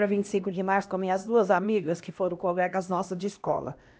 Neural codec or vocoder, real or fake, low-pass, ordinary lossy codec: codec, 16 kHz, 1 kbps, X-Codec, HuBERT features, trained on LibriSpeech; fake; none; none